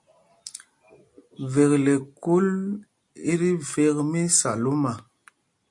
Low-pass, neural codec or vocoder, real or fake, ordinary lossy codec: 10.8 kHz; none; real; MP3, 48 kbps